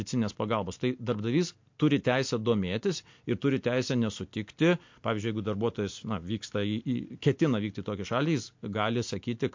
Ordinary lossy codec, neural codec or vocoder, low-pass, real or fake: MP3, 48 kbps; none; 7.2 kHz; real